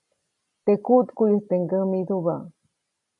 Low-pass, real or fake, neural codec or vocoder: 10.8 kHz; real; none